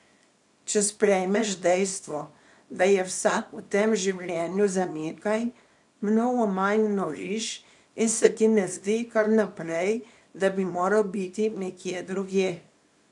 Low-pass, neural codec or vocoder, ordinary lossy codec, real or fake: 10.8 kHz; codec, 24 kHz, 0.9 kbps, WavTokenizer, small release; none; fake